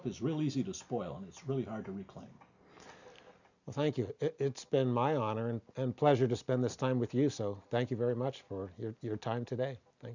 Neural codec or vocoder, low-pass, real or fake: none; 7.2 kHz; real